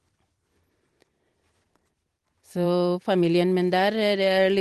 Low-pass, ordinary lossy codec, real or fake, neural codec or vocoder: 14.4 kHz; Opus, 24 kbps; fake; vocoder, 44.1 kHz, 128 mel bands every 256 samples, BigVGAN v2